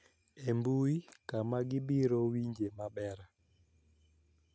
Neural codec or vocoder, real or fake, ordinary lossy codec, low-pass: none; real; none; none